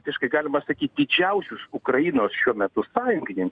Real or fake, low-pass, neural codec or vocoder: real; 9.9 kHz; none